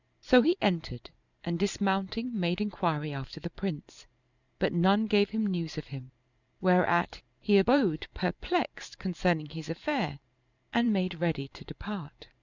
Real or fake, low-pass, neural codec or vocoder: fake; 7.2 kHz; vocoder, 44.1 kHz, 128 mel bands every 512 samples, BigVGAN v2